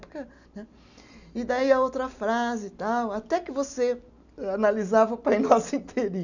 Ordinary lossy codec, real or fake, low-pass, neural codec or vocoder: none; real; 7.2 kHz; none